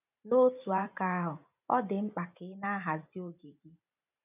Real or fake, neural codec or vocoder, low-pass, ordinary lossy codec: fake; vocoder, 44.1 kHz, 128 mel bands every 512 samples, BigVGAN v2; 3.6 kHz; none